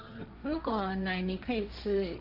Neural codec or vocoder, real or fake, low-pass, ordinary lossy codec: codec, 16 kHz, 1.1 kbps, Voila-Tokenizer; fake; 5.4 kHz; none